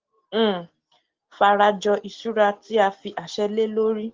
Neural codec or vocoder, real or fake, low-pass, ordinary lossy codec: none; real; 7.2 kHz; Opus, 16 kbps